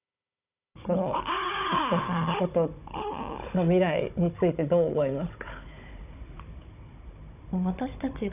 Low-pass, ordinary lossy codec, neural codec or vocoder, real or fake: 3.6 kHz; none; codec, 16 kHz, 16 kbps, FunCodec, trained on Chinese and English, 50 frames a second; fake